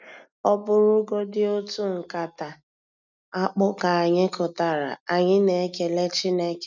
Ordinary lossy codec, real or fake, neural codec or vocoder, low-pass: none; real; none; 7.2 kHz